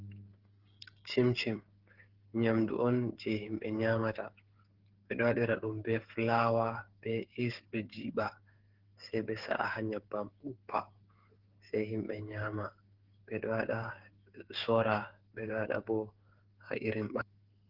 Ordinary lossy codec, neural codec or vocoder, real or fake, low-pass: Opus, 16 kbps; codec, 16 kHz, 8 kbps, FreqCodec, smaller model; fake; 5.4 kHz